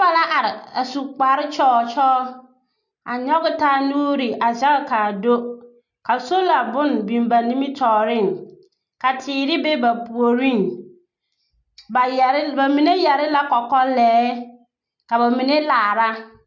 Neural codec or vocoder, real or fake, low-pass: none; real; 7.2 kHz